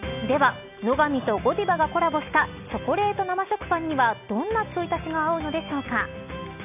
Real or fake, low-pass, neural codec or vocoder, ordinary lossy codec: real; 3.6 kHz; none; none